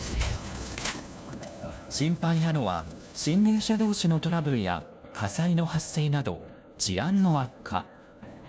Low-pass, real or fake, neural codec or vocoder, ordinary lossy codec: none; fake; codec, 16 kHz, 1 kbps, FunCodec, trained on LibriTTS, 50 frames a second; none